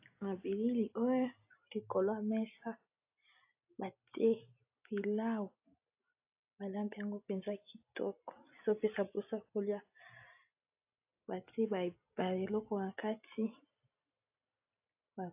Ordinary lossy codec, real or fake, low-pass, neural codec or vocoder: AAC, 32 kbps; real; 3.6 kHz; none